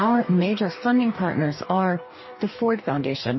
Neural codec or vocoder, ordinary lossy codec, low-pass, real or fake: codec, 44.1 kHz, 2.6 kbps, DAC; MP3, 24 kbps; 7.2 kHz; fake